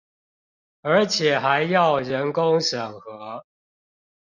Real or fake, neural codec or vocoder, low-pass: real; none; 7.2 kHz